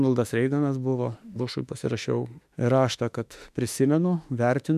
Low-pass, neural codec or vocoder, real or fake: 14.4 kHz; autoencoder, 48 kHz, 32 numbers a frame, DAC-VAE, trained on Japanese speech; fake